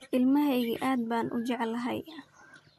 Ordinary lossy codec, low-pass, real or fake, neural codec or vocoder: MP3, 64 kbps; 14.4 kHz; real; none